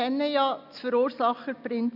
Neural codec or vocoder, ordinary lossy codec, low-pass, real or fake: none; none; 5.4 kHz; real